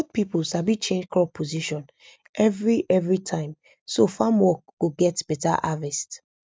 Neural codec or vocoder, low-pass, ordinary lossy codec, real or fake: none; none; none; real